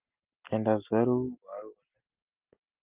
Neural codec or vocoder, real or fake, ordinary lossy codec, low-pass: none; real; Opus, 32 kbps; 3.6 kHz